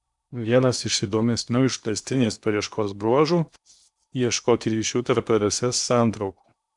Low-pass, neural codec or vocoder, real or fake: 10.8 kHz; codec, 16 kHz in and 24 kHz out, 0.8 kbps, FocalCodec, streaming, 65536 codes; fake